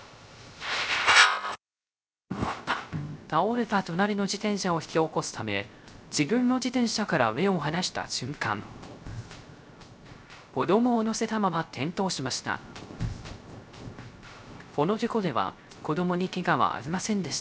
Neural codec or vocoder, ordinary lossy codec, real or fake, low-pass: codec, 16 kHz, 0.3 kbps, FocalCodec; none; fake; none